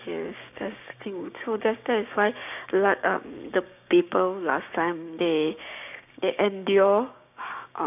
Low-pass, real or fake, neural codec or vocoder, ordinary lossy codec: 3.6 kHz; fake; codec, 16 kHz, 6 kbps, DAC; none